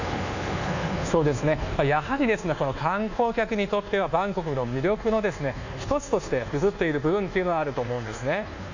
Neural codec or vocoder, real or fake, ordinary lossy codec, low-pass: codec, 24 kHz, 1.2 kbps, DualCodec; fake; none; 7.2 kHz